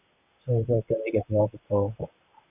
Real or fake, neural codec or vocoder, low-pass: fake; vocoder, 44.1 kHz, 128 mel bands, Pupu-Vocoder; 3.6 kHz